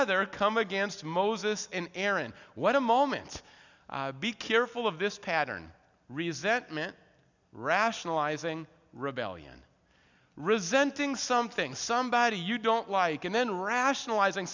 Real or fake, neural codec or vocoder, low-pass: real; none; 7.2 kHz